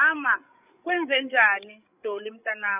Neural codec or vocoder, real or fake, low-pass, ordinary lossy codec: codec, 16 kHz, 8 kbps, FreqCodec, larger model; fake; 3.6 kHz; none